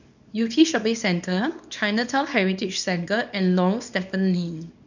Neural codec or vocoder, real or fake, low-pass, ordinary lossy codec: codec, 24 kHz, 0.9 kbps, WavTokenizer, small release; fake; 7.2 kHz; none